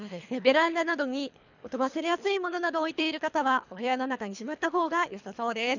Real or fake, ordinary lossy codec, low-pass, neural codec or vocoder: fake; none; 7.2 kHz; codec, 24 kHz, 3 kbps, HILCodec